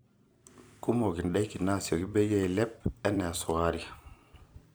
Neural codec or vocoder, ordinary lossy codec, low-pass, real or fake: vocoder, 44.1 kHz, 128 mel bands every 256 samples, BigVGAN v2; none; none; fake